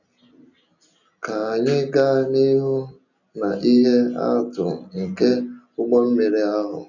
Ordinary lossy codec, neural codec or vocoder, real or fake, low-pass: none; none; real; 7.2 kHz